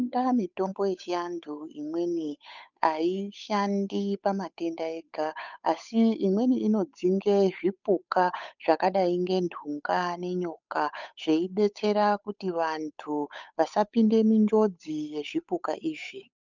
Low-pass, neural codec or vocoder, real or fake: 7.2 kHz; codec, 16 kHz, 8 kbps, FunCodec, trained on Chinese and English, 25 frames a second; fake